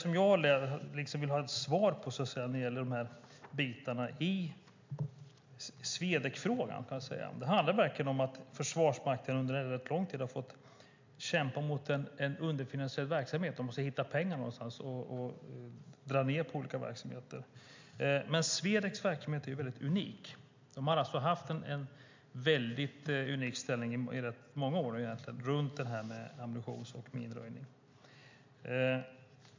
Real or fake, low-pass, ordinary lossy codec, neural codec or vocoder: real; 7.2 kHz; MP3, 64 kbps; none